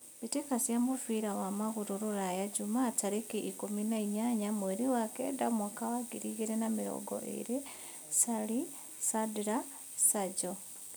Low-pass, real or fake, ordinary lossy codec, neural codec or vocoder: none; real; none; none